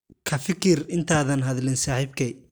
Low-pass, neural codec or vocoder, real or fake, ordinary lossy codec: none; none; real; none